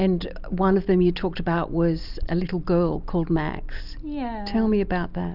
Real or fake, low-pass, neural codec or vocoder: real; 5.4 kHz; none